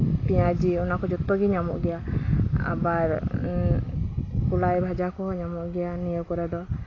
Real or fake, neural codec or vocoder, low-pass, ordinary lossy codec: real; none; 7.2 kHz; AAC, 32 kbps